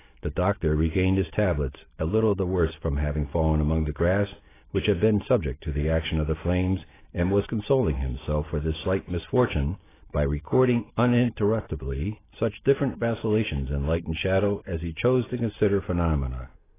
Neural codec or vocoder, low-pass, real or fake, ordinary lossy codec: none; 3.6 kHz; real; AAC, 16 kbps